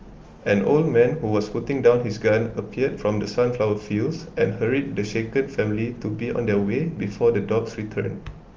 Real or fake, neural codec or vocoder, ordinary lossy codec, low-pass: real; none; Opus, 32 kbps; 7.2 kHz